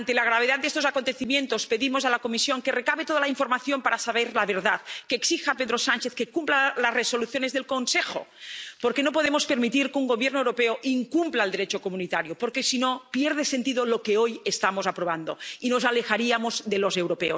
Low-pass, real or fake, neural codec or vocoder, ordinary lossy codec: none; real; none; none